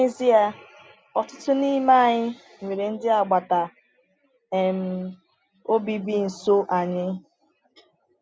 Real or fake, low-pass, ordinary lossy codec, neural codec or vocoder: real; none; none; none